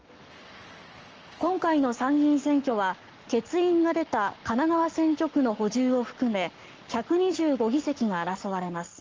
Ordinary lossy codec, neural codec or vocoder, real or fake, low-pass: Opus, 24 kbps; codec, 44.1 kHz, 7.8 kbps, Pupu-Codec; fake; 7.2 kHz